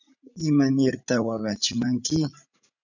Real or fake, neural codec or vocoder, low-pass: fake; vocoder, 44.1 kHz, 80 mel bands, Vocos; 7.2 kHz